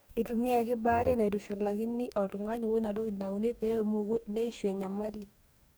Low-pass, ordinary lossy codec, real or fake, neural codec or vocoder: none; none; fake; codec, 44.1 kHz, 2.6 kbps, DAC